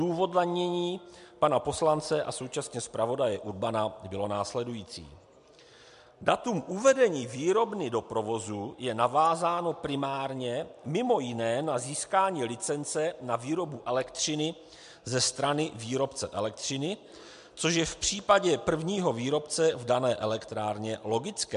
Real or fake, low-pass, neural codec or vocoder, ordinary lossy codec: real; 10.8 kHz; none; MP3, 64 kbps